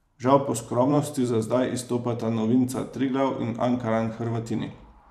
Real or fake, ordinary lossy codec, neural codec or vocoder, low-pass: fake; none; vocoder, 44.1 kHz, 128 mel bands every 512 samples, BigVGAN v2; 14.4 kHz